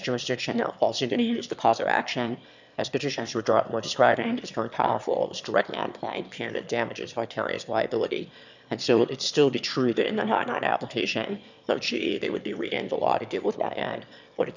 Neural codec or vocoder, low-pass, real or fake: autoencoder, 22.05 kHz, a latent of 192 numbers a frame, VITS, trained on one speaker; 7.2 kHz; fake